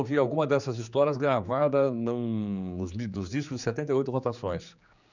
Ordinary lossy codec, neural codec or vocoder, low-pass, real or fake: none; codec, 16 kHz, 4 kbps, X-Codec, HuBERT features, trained on general audio; 7.2 kHz; fake